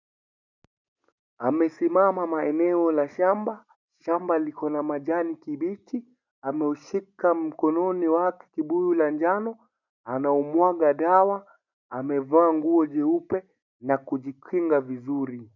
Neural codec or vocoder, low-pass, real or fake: none; 7.2 kHz; real